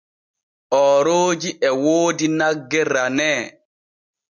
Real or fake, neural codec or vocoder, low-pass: real; none; 7.2 kHz